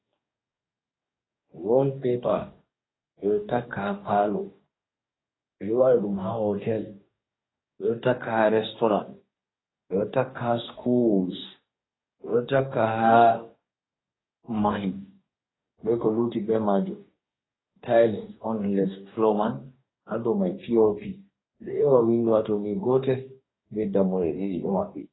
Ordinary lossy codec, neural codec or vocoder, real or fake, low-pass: AAC, 16 kbps; codec, 44.1 kHz, 2.6 kbps, DAC; fake; 7.2 kHz